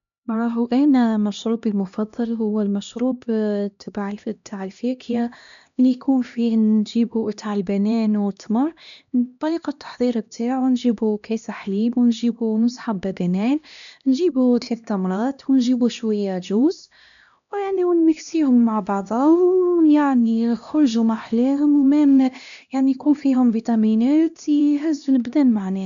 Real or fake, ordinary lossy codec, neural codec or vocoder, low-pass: fake; none; codec, 16 kHz, 1 kbps, X-Codec, HuBERT features, trained on LibriSpeech; 7.2 kHz